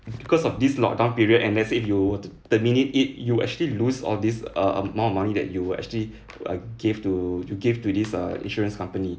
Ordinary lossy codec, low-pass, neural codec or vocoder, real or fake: none; none; none; real